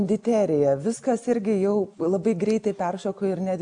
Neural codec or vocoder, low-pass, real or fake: none; 9.9 kHz; real